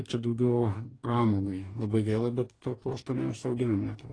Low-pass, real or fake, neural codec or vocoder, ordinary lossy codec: 9.9 kHz; fake; codec, 44.1 kHz, 2.6 kbps, DAC; AAC, 32 kbps